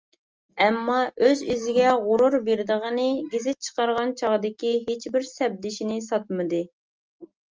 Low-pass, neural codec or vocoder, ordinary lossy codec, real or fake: 7.2 kHz; none; Opus, 24 kbps; real